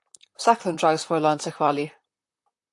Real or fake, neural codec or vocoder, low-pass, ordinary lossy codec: fake; vocoder, 44.1 kHz, 128 mel bands, Pupu-Vocoder; 10.8 kHz; AAC, 64 kbps